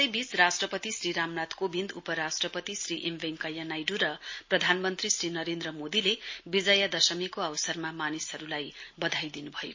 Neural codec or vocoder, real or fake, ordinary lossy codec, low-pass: none; real; MP3, 32 kbps; 7.2 kHz